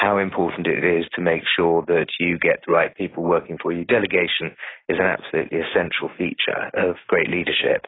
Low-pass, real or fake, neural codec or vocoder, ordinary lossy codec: 7.2 kHz; real; none; AAC, 16 kbps